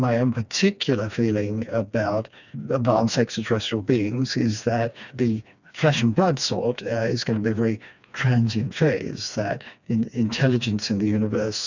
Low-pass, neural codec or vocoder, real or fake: 7.2 kHz; codec, 16 kHz, 2 kbps, FreqCodec, smaller model; fake